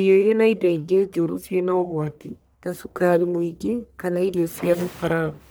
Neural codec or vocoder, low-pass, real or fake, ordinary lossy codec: codec, 44.1 kHz, 1.7 kbps, Pupu-Codec; none; fake; none